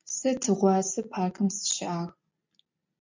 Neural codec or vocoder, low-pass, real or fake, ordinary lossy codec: none; 7.2 kHz; real; MP3, 64 kbps